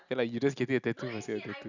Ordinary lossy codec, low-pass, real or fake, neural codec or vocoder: none; 7.2 kHz; real; none